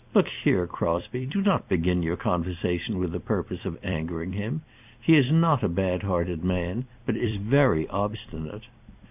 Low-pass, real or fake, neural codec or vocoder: 3.6 kHz; fake; vocoder, 44.1 kHz, 128 mel bands every 256 samples, BigVGAN v2